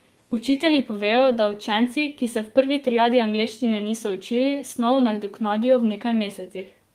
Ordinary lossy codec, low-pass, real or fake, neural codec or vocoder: Opus, 32 kbps; 14.4 kHz; fake; codec, 32 kHz, 1.9 kbps, SNAC